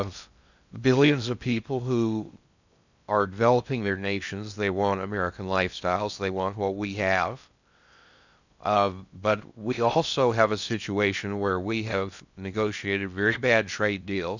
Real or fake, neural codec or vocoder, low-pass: fake; codec, 16 kHz in and 24 kHz out, 0.6 kbps, FocalCodec, streaming, 2048 codes; 7.2 kHz